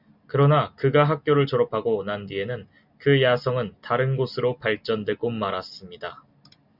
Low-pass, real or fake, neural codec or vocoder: 5.4 kHz; real; none